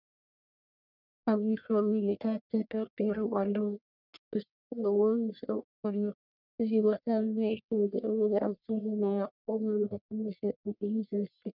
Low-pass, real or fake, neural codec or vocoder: 5.4 kHz; fake; codec, 44.1 kHz, 1.7 kbps, Pupu-Codec